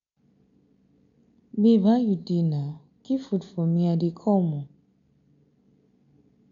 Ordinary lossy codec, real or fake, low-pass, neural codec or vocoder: none; real; 7.2 kHz; none